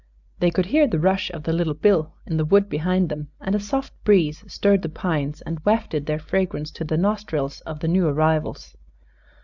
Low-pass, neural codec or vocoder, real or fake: 7.2 kHz; none; real